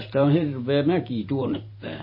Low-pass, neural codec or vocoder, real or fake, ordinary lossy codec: 5.4 kHz; vocoder, 44.1 kHz, 128 mel bands every 512 samples, BigVGAN v2; fake; MP3, 24 kbps